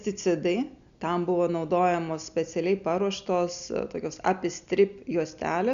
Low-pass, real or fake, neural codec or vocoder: 7.2 kHz; real; none